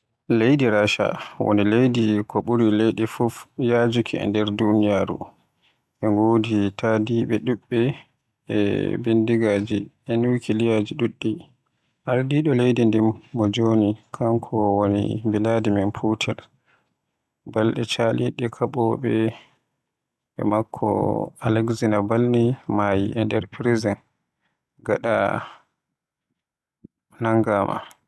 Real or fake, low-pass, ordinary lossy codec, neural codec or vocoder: real; none; none; none